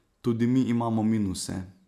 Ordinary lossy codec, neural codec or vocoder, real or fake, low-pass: none; none; real; 14.4 kHz